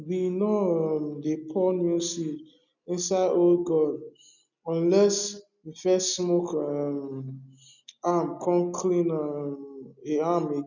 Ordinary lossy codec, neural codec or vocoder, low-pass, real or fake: none; none; 7.2 kHz; real